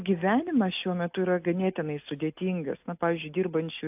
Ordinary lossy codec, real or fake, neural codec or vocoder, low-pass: AAC, 32 kbps; real; none; 3.6 kHz